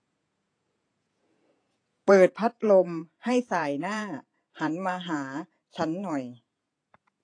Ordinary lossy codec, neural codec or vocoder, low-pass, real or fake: AAC, 48 kbps; vocoder, 48 kHz, 128 mel bands, Vocos; 9.9 kHz; fake